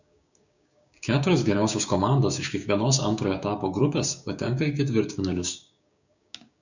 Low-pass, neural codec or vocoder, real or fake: 7.2 kHz; codec, 16 kHz, 6 kbps, DAC; fake